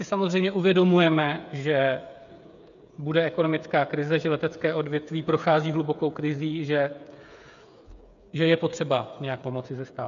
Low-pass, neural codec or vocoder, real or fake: 7.2 kHz; codec, 16 kHz, 8 kbps, FreqCodec, smaller model; fake